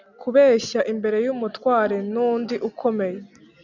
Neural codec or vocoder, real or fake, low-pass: none; real; 7.2 kHz